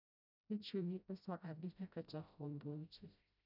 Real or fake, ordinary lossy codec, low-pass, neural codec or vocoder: fake; none; 5.4 kHz; codec, 16 kHz, 0.5 kbps, FreqCodec, smaller model